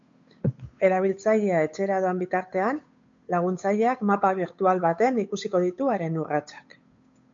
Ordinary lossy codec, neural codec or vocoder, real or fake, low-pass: MP3, 48 kbps; codec, 16 kHz, 8 kbps, FunCodec, trained on Chinese and English, 25 frames a second; fake; 7.2 kHz